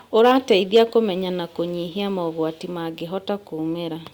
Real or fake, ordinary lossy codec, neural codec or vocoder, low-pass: real; Opus, 64 kbps; none; 19.8 kHz